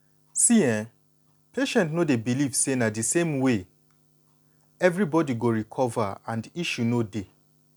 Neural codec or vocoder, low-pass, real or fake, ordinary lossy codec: none; 19.8 kHz; real; none